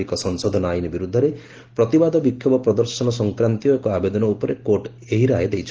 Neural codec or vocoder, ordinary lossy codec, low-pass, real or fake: none; Opus, 16 kbps; 7.2 kHz; real